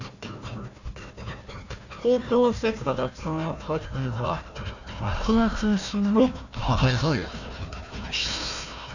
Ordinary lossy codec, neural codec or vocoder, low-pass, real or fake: none; codec, 16 kHz, 1 kbps, FunCodec, trained on Chinese and English, 50 frames a second; 7.2 kHz; fake